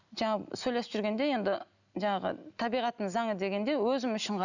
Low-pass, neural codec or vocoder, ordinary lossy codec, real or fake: 7.2 kHz; none; none; real